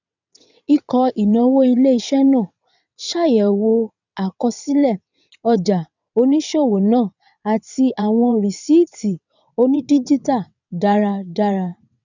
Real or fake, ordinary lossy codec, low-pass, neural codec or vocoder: fake; none; 7.2 kHz; vocoder, 22.05 kHz, 80 mel bands, WaveNeXt